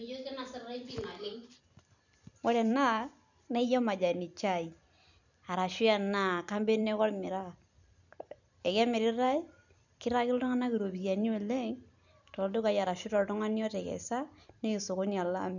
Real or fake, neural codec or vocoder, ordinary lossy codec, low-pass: fake; vocoder, 44.1 kHz, 128 mel bands every 256 samples, BigVGAN v2; none; 7.2 kHz